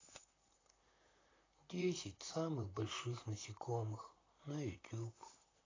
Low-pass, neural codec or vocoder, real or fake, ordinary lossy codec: 7.2 kHz; vocoder, 44.1 kHz, 128 mel bands, Pupu-Vocoder; fake; AAC, 32 kbps